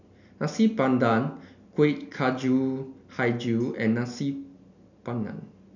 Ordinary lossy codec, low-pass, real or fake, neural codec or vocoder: none; 7.2 kHz; real; none